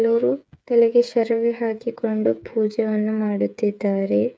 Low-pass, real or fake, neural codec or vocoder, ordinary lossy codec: none; fake; codec, 16 kHz, 8 kbps, FreqCodec, smaller model; none